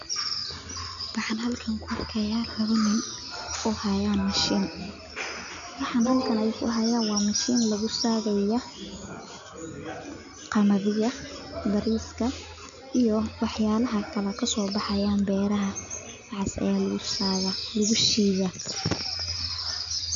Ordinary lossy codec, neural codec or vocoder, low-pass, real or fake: none; none; 7.2 kHz; real